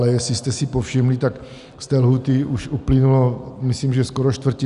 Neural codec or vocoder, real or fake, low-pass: none; real; 10.8 kHz